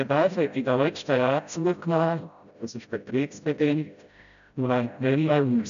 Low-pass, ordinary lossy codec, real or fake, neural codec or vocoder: 7.2 kHz; none; fake; codec, 16 kHz, 0.5 kbps, FreqCodec, smaller model